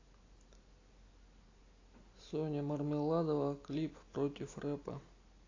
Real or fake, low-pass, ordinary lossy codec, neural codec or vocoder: real; 7.2 kHz; AAC, 32 kbps; none